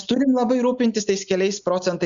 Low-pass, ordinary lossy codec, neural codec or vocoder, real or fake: 7.2 kHz; Opus, 64 kbps; none; real